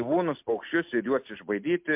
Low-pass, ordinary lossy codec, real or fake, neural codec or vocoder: 3.6 kHz; MP3, 32 kbps; real; none